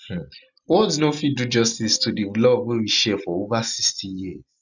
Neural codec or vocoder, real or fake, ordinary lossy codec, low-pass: none; real; none; 7.2 kHz